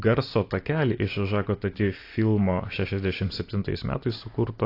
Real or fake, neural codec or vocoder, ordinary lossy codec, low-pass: real; none; AAC, 32 kbps; 5.4 kHz